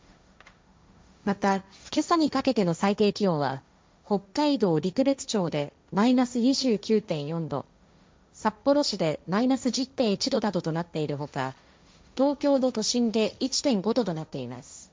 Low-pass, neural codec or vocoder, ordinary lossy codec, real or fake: none; codec, 16 kHz, 1.1 kbps, Voila-Tokenizer; none; fake